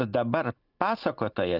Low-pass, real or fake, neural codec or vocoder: 5.4 kHz; real; none